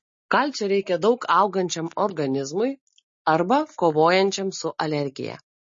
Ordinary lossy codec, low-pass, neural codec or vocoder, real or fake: MP3, 32 kbps; 7.2 kHz; none; real